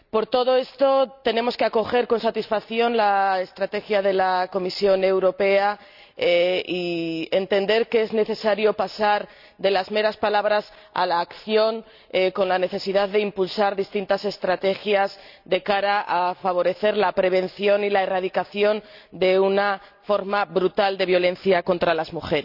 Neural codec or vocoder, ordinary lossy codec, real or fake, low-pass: none; none; real; 5.4 kHz